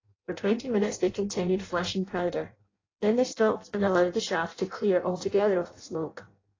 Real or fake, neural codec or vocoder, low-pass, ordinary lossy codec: fake; codec, 16 kHz in and 24 kHz out, 0.6 kbps, FireRedTTS-2 codec; 7.2 kHz; AAC, 32 kbps